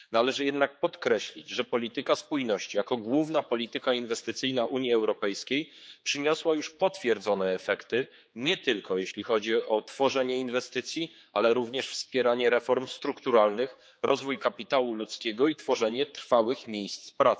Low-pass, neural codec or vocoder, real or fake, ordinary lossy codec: none; codec, 16 kHz, 4 kbps, X-Codec, HuBERT features, trained on general audio; fake; none